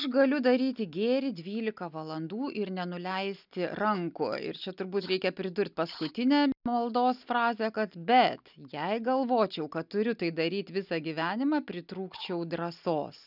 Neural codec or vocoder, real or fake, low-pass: none; real; 5.4 kHz